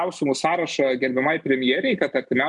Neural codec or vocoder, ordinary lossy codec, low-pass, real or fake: none; MP3, 96 kbps; 10.8 kHz; real